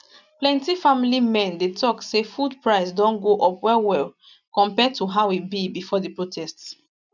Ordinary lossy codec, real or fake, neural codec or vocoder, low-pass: none; real; none; 7.2 kHz